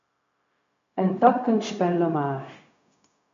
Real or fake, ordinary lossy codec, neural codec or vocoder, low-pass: fake; MP3, 64 kbps; codec, 16 kHz, 0.4 kbps, LongCat-Audio-Codec; 7.2 kHz